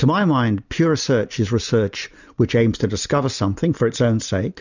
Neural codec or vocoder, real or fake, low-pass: none; real; 7.2 kHz